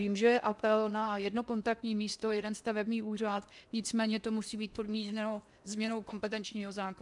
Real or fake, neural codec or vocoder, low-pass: fake; codec, 16 kHz in and 24 kHz out, 0.8 kbps, FocalCodec, streaming, 65536 codes; 10.8 kHz